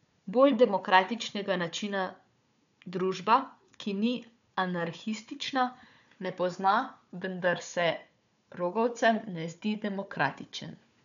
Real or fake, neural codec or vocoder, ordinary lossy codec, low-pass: fake; codec, 16 kHz, 4 kbps, FunCodec, trained on Chinese and English, 50 frames a second; none; 7.2 kHz